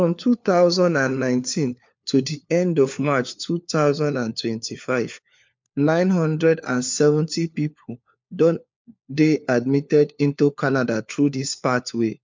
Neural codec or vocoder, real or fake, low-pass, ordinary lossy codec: codec, 16 kHz, 4 kbps, FunCodec, trained on LibriTTS, 50 frames a second; fake; 7.2 kHz; MP3, 64 kbps